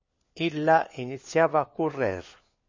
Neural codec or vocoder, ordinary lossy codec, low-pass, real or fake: codec, 16 kHz, 4 kbps, FunCodec, trained on LibriTTS, 50 frames a second; MP3, 32 kbps; 7.2 kHz; fake